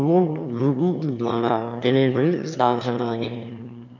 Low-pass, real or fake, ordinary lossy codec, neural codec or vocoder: 7.2 kHz; fake; none; autoencoder, 22.05 kHz, a latent of 192 numbers a frame, VITS, trained on one speaker